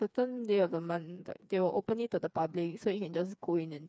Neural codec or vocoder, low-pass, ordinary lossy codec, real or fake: codec, 16 kHz, 4 kbps, FreqCodec, smaller model; none; none; fake